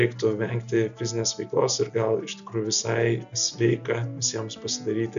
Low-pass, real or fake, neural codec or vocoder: 7.2 kHz; real; none